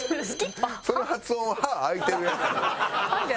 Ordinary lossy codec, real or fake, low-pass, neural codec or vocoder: none; real; none; none